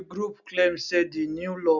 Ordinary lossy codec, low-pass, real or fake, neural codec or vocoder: none; 7.2 kHz; real; none